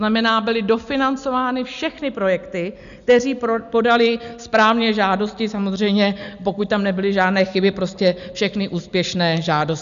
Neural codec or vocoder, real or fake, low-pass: none; real; 7.2 kHz